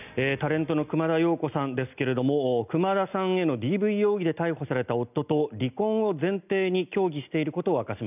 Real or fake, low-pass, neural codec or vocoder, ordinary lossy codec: real; 3.6 kHz; none; none